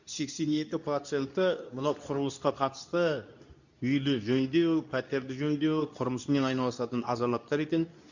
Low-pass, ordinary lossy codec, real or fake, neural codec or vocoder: 7.2 kHz; none; fake; codec, 24 kHz, 0.9 kbps, WavTokenizer, medium speech release version 2